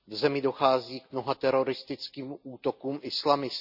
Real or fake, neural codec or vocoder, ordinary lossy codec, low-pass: real; none; none; 5.4 kHz